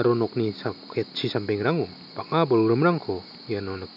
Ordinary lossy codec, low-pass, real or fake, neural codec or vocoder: none; 5.4 kHz; real; none